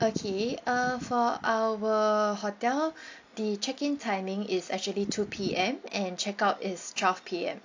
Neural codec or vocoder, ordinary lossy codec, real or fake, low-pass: none; AAC, 48 kbps; real; 7.2 kHz